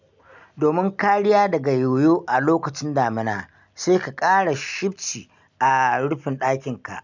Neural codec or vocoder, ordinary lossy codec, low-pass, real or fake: none; none; 7.2 kHz; real